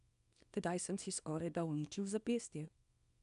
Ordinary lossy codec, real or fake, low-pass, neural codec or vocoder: none; fake; 10.8 kHz; codec, 24 kHz, 0.9 kbps, WavTokenizer, small release